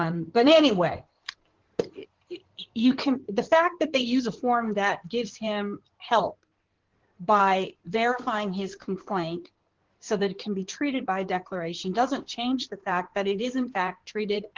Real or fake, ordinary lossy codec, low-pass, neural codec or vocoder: fake; Opus, 16 kbps; 7.2 kHz; codec, 16 kHz in and 24 kHz out, 2.2 kbps, FireRedTTS-2 codec